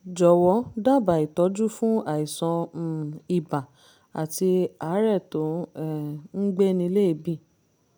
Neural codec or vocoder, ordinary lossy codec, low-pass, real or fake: none; none; none; real